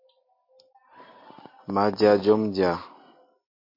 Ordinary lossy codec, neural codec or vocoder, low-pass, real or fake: MP3, 32 kbps; none; 5.4 kHz; real